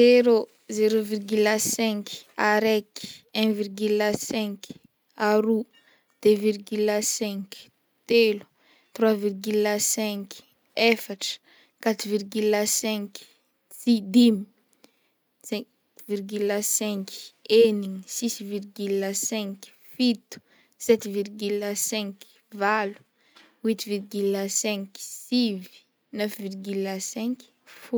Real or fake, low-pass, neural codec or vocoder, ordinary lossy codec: real; none; none; none